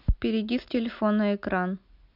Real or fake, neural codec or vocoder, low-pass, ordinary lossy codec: real; none; 5.4 kHz; AAC, 48 kbps